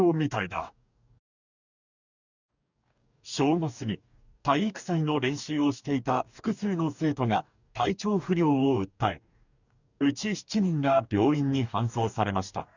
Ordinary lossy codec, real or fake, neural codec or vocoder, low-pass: none; fake; codec, 44.1 kHz, 2.6 kbps, DAC; 7.2 kHz